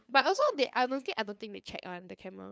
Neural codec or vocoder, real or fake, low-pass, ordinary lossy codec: codec, 16 kHz, 2 kbps, FunCodec, trained on LibriTTS, 25 frames a second; fake; none; none